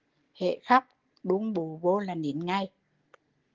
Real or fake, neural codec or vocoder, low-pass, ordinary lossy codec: real; none; 7.2 kHz; Opus, 16 kbps